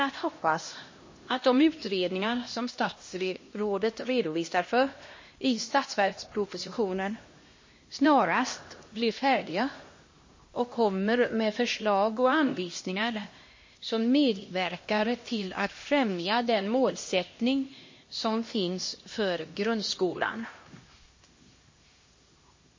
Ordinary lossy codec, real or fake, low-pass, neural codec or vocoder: MP3, 32 kbps; fake; 7.2 kHz; codec, 16 kHz, 1 kbps, X-Codec, HuBERT features, trained on LibriSpeech